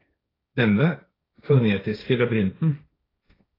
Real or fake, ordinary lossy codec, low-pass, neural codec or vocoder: fake; AAC, 24 kbps; 5.4 kHz; codec, 16 kHz, 1.1 kbps, Voila-Tokenizer